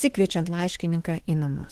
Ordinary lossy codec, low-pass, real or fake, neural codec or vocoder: Opus, 16 kbps; 14.4 kHz; fake; autoencoder, 48 kHz, 32 numbers a frame, DAC-VAE, trained on Japanese speech